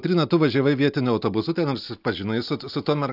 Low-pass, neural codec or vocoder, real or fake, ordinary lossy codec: 5.4 kHz; none; real; AAC, 48 kbps